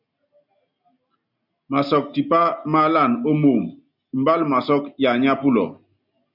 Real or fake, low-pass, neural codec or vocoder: real; 5.4 kHz; none